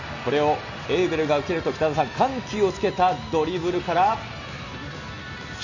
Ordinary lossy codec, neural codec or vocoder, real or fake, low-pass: none; none; real; 7.2 kHz